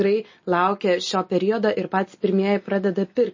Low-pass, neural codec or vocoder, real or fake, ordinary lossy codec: 7.2 kHz; none; real; MP3, 32 kbps